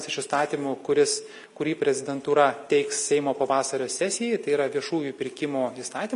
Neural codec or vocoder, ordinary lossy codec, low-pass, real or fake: none; MP3, 48 kbps; 14.4 kHz; real